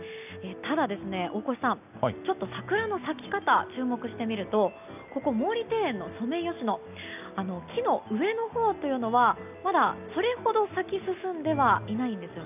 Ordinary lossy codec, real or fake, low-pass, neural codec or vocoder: none; real; 3.6 kHz; none